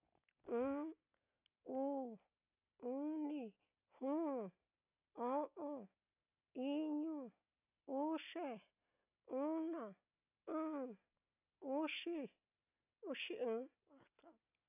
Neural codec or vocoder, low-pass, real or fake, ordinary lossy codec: none; 3.6 kHz; real; none